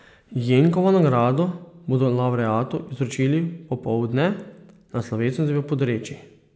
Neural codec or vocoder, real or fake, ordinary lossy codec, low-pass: none; real; none; none